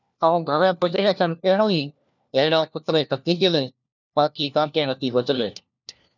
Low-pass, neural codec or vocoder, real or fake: 7.2 kHz; codec, 16 kHz, 1 kbps, FunCodec, trained on LibriTTS, 50 frames a second; fake